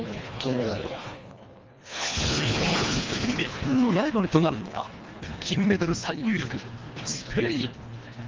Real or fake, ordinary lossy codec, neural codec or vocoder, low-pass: fake; Opus, 32 kbps; codec, 24 kHz, 1.5 kbps, HILCodec; 7.2 kHz